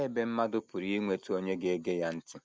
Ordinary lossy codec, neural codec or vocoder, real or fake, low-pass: none; none; real; none